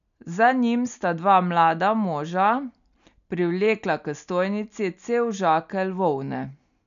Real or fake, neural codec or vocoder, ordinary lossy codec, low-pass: real; none; none; 7.2 kHz